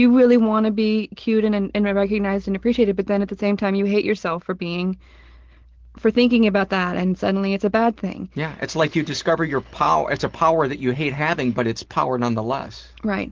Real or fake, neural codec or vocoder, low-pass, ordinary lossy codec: real; none; 7.2 kHz; Opus, 16 kbps